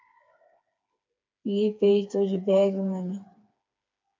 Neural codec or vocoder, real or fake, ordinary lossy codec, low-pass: codec, 16 kHz, 4 kbps, FreqCodec, smaller model; fake; MP3, 48 kbps; 7.2 kHz